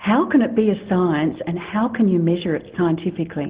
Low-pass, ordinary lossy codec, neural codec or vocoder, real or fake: 3.6 kHz; Opus, 16 kbps; none; real